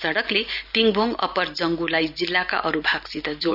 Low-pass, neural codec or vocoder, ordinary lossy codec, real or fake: 5.4 kHz; none; none; real